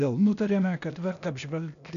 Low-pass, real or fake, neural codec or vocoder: 7.2 kHz; fake; codec, 16 kHz, 0.8 kbps, ZipCodec